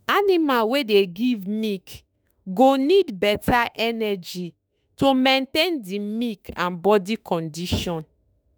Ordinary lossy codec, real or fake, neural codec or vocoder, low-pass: none; fake; autoencoder, 48 kHz, 32 numbers a frame, DAC-VAE, trained on Japanese speech; none